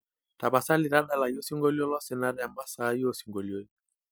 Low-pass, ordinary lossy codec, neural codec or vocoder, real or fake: none; none; none; real